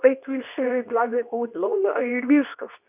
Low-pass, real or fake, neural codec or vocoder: 3.6 kHz; fake; codec, 24 kHz, 0.9 kbps, WavTokenizer, medium speech release version 2